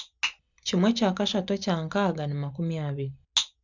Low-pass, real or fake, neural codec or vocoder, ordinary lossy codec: 7.2 kHz; real; none; none